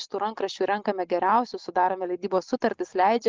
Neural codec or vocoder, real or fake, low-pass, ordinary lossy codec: none; real; 7.2 kHz; Opus, 32 kbps